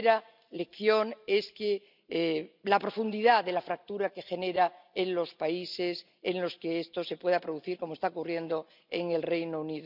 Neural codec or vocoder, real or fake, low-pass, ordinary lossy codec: none; real; 5.4 kHz; none